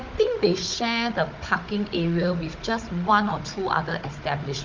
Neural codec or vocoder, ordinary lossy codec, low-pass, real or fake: codec, 16 kHz, 16 kbps, FunCodec, trained on Chinese and English, 50 frames a second; Opus, 32 kbps; 7.2 kHz; fake